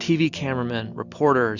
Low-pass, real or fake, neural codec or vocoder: 7.2 kHz; real; none